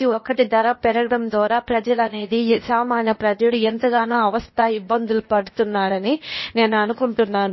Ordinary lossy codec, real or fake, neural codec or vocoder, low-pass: MP3, 24 kbps; fake; codec, 16 kHz, 0.8 kbps, ZipCodec; 7.2 kHz